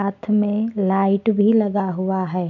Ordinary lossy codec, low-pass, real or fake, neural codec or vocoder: none; 7.2 kHz; real; none